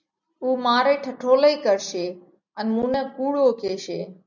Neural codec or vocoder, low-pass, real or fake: none; 7.2 kHz; real